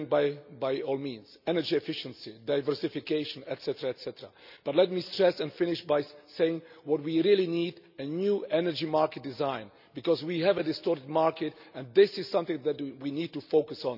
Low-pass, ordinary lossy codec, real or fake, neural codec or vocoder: 5.4 kHz; none; real; none